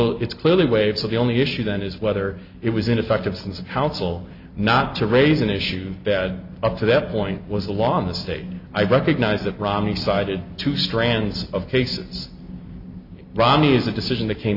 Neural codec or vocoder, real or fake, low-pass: none; real; 5.4 kHz